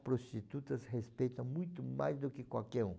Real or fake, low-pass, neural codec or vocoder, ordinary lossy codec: real; none; none; none